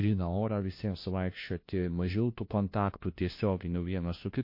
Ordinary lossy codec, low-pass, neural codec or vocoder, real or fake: MP3, 32 kbps; 5.4 kHz; codec, 16 kHz, 1 kbps, FunCodec, trained on LibriTTS, 50 frames a second; fake